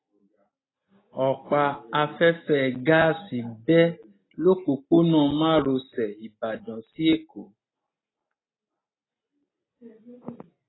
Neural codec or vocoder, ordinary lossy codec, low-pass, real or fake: none; AAC, 16 kbps; 7.2 kHz; real